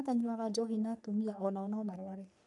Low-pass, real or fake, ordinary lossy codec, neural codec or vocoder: 10.8 kHz; fake; none; codec, 44.1 kHz, 3.4 kbps, Pupu-Codec